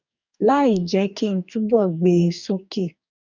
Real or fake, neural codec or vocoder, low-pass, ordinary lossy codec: fake; codec, 44.1 kHz, 2.6 kbps, DAC; 7.2 kHz; none